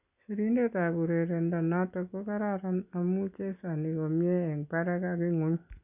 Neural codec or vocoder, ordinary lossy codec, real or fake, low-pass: none; none; real; 3.6 kHz